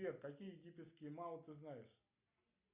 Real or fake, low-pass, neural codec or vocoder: real; 3.6 kHz; none